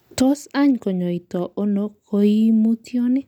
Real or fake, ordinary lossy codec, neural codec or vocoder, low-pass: real; none; none; 19.8 kHz